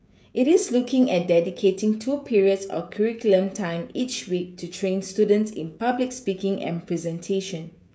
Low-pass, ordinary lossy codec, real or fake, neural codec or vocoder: none; none; fake; codec, 16 kHz, 16 kbps, FreqCodec, smaller model